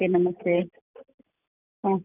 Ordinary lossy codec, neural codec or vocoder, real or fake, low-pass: none; none; real; 3.6 kHz